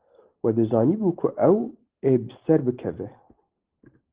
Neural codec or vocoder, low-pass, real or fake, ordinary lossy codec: none; 3.6 kHz; real; Opus, 16 kbps